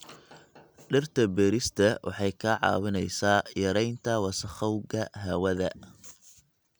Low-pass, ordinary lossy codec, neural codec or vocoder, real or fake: none; none; none; real